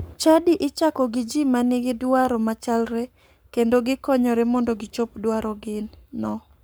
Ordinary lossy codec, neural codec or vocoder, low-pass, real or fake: none; codec, 44.1 kHz, 7.8 kbps, Pupu-Codec; none; fake